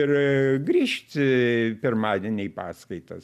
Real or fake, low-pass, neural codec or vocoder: real; 14.4 kHz; none